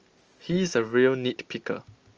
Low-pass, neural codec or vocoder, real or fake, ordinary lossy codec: 7.2 kHz; none; real; Opus, 24 kbps